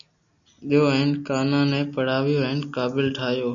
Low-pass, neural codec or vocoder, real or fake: 7.2 kHz; none; real